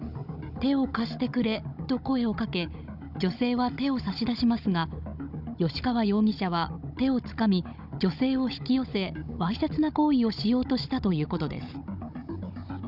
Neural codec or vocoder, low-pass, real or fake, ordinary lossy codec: codec, 16 kHz, 16 kbps, FunCodec, trained on Chinese and English, 50 frames a second; 5.4 kHz; fake; none